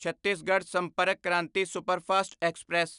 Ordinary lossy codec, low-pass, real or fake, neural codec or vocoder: none; 10.8 kHz; real; none